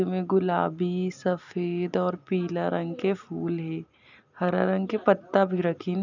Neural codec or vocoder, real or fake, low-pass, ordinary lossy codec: none; real; 7.2 kHz; none